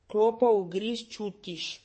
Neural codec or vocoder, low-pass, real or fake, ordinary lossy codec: codec, 32 kHz, 1.9 kbps, SNAC; 9.9 kHz; fake; MP3, 32 kbps